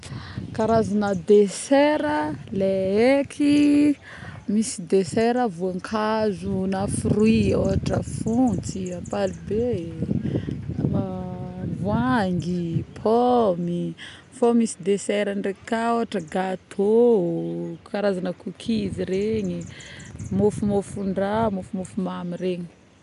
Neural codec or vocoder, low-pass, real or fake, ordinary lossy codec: none; 10.8 kHz; real; none